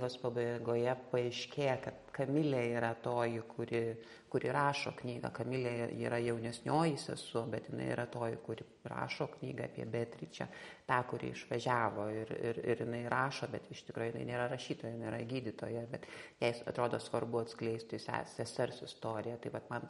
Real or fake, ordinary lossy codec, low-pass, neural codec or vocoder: real; MP3, 48 kbps; 19.8 kHz; none